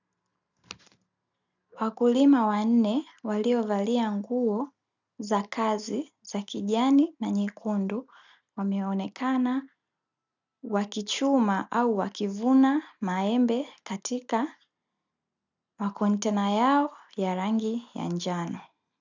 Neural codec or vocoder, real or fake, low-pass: none; real; 7.2 kHz